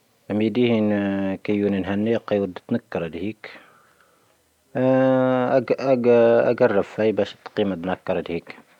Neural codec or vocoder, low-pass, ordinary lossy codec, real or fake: none; 19.8 kHz; none; real